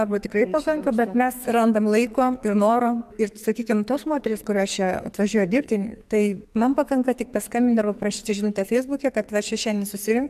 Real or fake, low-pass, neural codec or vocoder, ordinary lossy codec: fake; 14.4 kHz; codec, 44.1 kHz, 2.6 kbps, SNAC; AAC, 96 kbps